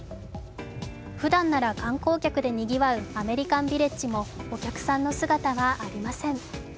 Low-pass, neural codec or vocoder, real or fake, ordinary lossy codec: none; none; real; none